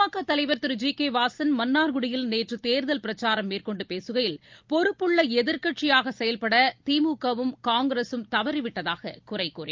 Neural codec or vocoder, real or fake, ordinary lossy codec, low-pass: none; real; Opus, 24 kbps; 7.2 kHz